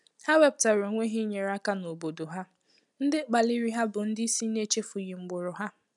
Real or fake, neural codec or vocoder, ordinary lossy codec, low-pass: real; none; none; 10.8 kHz